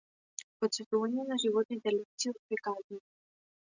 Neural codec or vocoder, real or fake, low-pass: none; real; 7.2 kHz